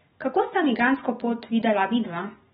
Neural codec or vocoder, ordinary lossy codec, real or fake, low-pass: none; AAC, 16 kbps; real; 19.8 kHz